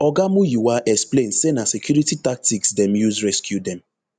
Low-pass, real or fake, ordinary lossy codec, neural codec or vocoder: 9.9 kHz; real; none; none